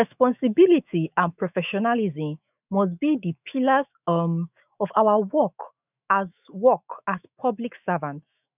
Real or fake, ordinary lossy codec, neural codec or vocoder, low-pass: fake; none; autoencoder, 48 kHz, 128 numbers a frame, DAC-VAE, trained on Japanese speech; 3.6 kHz